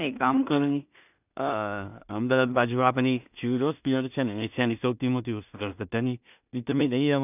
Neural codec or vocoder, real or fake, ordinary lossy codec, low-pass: codec, 16 kHz in and 24 kHz out, 0.4 kbps, LongCat-Audio-Codec, two codebook decoder; fake; none; 3.6 kHz